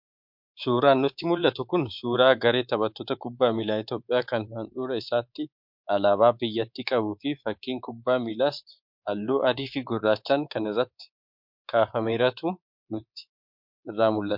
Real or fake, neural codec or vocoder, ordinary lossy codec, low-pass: fake; codec, 24 kHz, 3.1 kbps, DualCodec; MP3, 48 kbps; 5.4 kHz